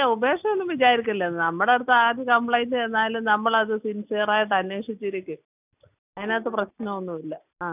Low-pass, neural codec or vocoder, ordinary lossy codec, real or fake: 3.6 kHz; none; none; real